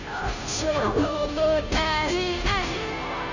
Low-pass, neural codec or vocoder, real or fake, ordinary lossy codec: 7.2 kHz; codec, 16 kHz, 0.5 kbps, FunCodec, trained on Chinese and English, 25 frames a second; fake; none